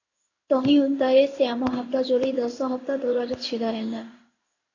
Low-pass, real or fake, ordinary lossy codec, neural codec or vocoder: 7.2 kHz; fake; AAC, 32 kbps; codec, 16 kHz in and 24 kHz out, 1 kbps, XY-Tokenizer